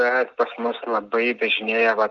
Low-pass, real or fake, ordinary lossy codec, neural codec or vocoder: 7.2 kHz; real; Opus, 32 kbps; none